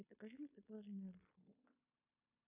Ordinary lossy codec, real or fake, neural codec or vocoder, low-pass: AAC, 32 kbps; fake; codec, 16 kHz in and 24 kHz out, 0.9 kbps, LongCat-Audio-Codec, fine tuned four codebook decoder; 3.6 kHz